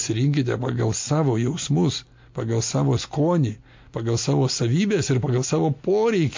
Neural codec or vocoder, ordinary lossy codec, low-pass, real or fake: none; MP3, 48 kbps; 7.2 kHz; real